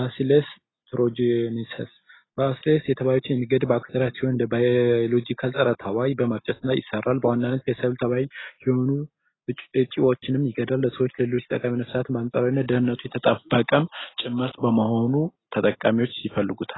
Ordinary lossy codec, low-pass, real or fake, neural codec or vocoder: AAC, 16 kbps; 7.2 kHz; real; none